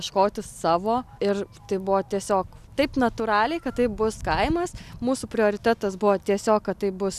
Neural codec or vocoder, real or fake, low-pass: none; real; 14.4 kHz